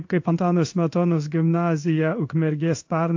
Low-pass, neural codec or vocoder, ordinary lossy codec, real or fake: 7.2 kHz; codec, 16 kHz in and 24 kHz out, 1 kbps, XY-Tokenizer; AAC, 48 kbps; fake